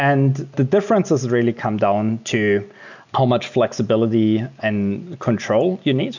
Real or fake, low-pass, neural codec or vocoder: real; 7.2 kHz; none